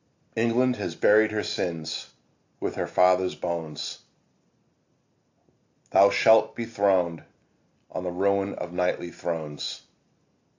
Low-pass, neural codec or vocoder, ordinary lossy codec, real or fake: 7.2 kHz; none; AAC, 48 kbps; real